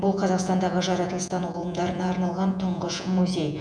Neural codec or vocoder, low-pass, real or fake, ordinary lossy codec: vocoder, 48 kHz, 128 mel bands, Vocos; 9.9 kHz; fake; none